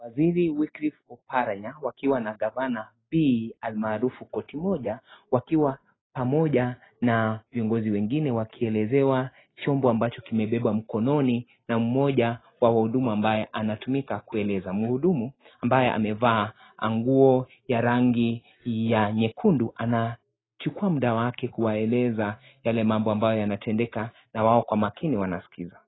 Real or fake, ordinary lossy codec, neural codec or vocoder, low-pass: real; AAC, 16 kbps; none; 7.2 kHz